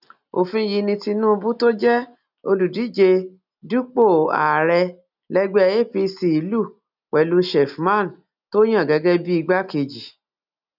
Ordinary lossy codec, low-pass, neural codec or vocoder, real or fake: none; 5.4 kHz; none; real